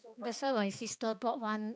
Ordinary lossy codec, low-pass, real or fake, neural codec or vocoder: none; none; fake; codec, 16 kHz, 2 kbps, X-Codec, HuBERT features, trained on balanced general audio